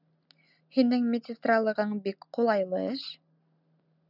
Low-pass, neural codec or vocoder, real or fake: 5.4 kHz; none; real